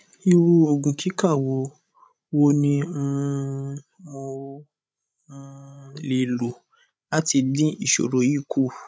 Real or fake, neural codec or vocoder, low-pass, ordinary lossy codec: fake; codec, 16 kHz, 16 kbps, FreqCodec, larger model; none; none